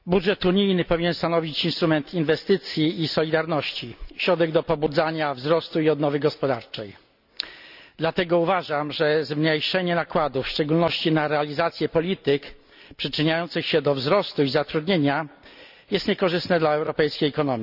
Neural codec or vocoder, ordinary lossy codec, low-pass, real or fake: none; none; 5.4 kHz; real